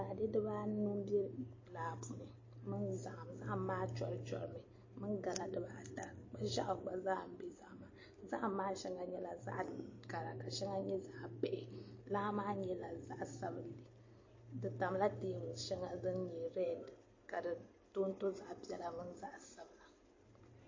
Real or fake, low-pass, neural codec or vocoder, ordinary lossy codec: real; 7.2 kHz; none; MP3, 32 kbps